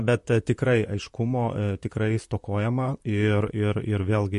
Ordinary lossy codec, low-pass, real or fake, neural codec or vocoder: MP3, 48 kbps; 14.4 kHz; fake; vocoder, 44.1 kHz, 128 mel bands, Pupu-Vocoder